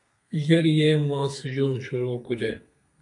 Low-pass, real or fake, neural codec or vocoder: 10.8 kHz; fake; codec, 32 kHz, 1.9 kbps, SNAC